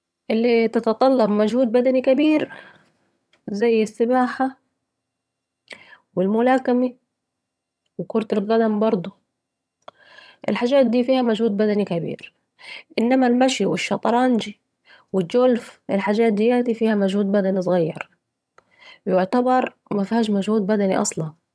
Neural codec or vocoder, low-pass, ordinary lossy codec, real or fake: vocoder, 22.05 kHz, 80 mel bands, HiFi-GAN; none; none; fake